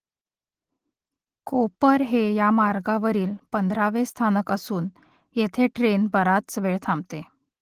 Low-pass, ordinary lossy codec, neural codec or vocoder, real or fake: 14.4 kHz; Opus, 16 kbps; none; real